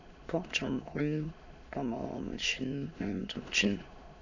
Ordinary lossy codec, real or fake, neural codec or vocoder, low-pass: none; fake; autoencoder, 22.05 kHz, a latent of 192 numbers a frame, VITS, trained on many speakers; 7.2 kHz